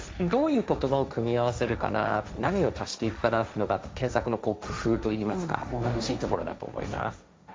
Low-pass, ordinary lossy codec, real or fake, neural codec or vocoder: none; none; fake; codec, 16 kHz, 1.1 kbps, Voila-Tokenizer